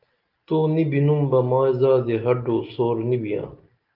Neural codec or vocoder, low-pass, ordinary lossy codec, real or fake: none; 5.4 kHz; Opus, 32 kbps; real